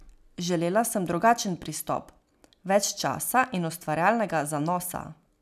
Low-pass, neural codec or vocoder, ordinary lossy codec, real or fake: 14.4 kHz; none; none; real